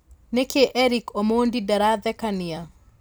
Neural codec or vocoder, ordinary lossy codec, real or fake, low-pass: vocoder, 44.1 kHz, 128 mel bands every 256 samples, BigVGAN v2; none; fake; none